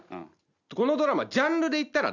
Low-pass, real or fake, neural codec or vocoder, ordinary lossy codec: 7.2 kHz; real; none; none